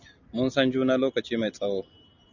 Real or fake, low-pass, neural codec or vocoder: real; 7.2 kHz; none